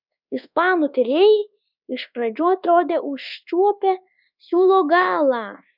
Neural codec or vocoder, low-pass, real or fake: codec, 24 kHz, 1.2 kbps, DualCodec; 5.4 kHz; fake